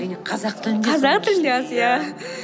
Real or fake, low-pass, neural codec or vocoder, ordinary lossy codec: real; none; none; none